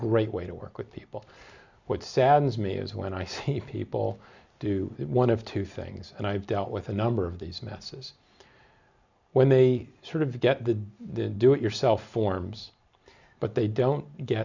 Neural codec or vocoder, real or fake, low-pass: none; real; 7.2 kHz